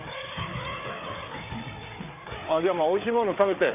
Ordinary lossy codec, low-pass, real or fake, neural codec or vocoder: AAC, 24 kbps; 3.6 kHz; fake; codec, 16 kHz, 4 kbps, FreqCodec, larger model